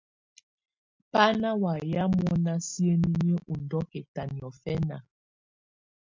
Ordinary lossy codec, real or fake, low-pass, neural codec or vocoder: MP3, 64 kbps; real; 7.2 kHz; none